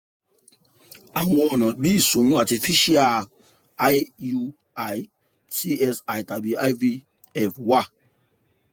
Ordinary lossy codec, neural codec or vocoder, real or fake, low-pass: none; vocoder, 48 kHz, 128 mel bands, Vocos; fake; none